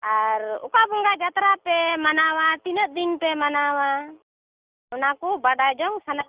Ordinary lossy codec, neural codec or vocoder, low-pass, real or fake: Opus, 24 kbps; none; 3.6 kHz; real